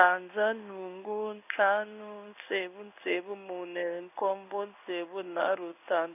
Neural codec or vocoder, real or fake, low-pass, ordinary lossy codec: codec, 16 kHz in and 24 kHz out, 1 kbps, XY-Tokenizer; fake; 3.6 kHz; none